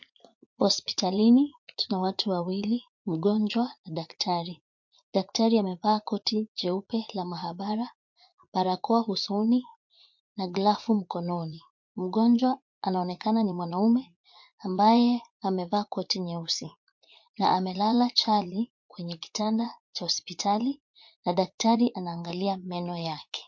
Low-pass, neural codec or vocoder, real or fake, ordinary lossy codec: 7.2 kHz; vocoder, 44.1 kHz, 80 mel bands, Vocos; fake; MP3, 48 kbps